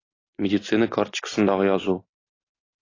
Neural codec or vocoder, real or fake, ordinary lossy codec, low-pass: none; real; AAC, 32 kbps; 7.2 kHz